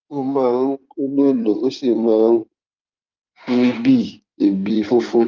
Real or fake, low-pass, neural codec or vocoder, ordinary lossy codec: fake; 7.2 kHz; codec, 16 kHz in and 24 kHz out, 2.2 kbps, FireRedTTS-2 codec; Opus, 24 kbps